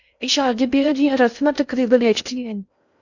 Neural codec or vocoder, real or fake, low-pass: codec, 16 kHz in and 24 kHz out, 0.6 kbps, FocalCodec, streaming, 2048 codes; fake; 7.2 kHz